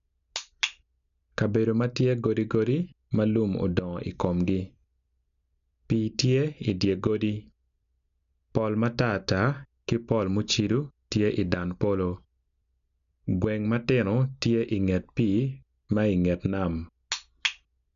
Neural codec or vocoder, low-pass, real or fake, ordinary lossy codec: none; 7.2 kHz; real; none